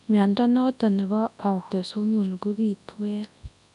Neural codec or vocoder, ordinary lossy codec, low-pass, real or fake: codec, 24 kHz, 0.9 kbps, WavTokenizer, large speech release; none; 10.8 kHz; fake